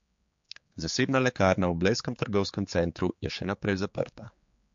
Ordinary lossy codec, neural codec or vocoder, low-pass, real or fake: MP3, 48 kbps; codec, 16 kHz, 4 kbps, X-Codec, HuBERT features, trained on general audio; 7.2 kHz; fake